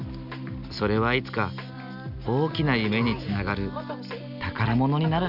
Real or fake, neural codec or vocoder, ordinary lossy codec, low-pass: real; none; none; 5.4 kHz